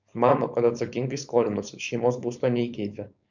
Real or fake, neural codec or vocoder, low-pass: fake; codec, 16 kHz, 4.8 kbps, FACodec; 7.2 kHz